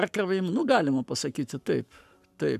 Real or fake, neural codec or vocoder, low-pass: fake; codec, 44.1 kHz, 7.8 kbps, Pupu-Codec; 14.4 kHz